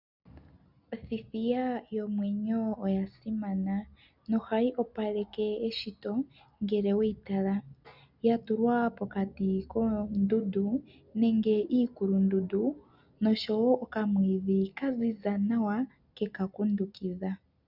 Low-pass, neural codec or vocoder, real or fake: 5.4 kHz; none; real